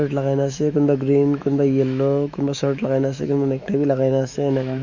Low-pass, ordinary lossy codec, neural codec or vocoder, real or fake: 7.2 kHz; Opus, 64 kbps; autoencoder, 48 kHz, 128 numbers a frame, DAC-VAE, trained on Japanese speech; fake